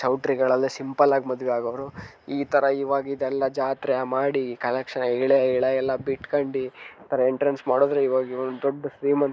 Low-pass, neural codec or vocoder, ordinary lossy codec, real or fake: none; none; none; real